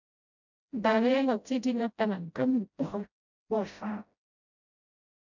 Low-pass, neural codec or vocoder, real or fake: 7.2 kHz; codec, 16 kHz, 0.5 kbps, FreqCodec, smaller model; fake